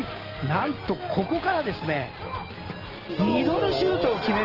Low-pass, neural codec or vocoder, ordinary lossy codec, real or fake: 5.4 kHz; none; Opus, 16 kbps; real